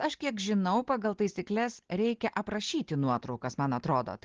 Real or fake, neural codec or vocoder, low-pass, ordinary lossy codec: real; none; 7.2 kHz; Opus, 16 kbps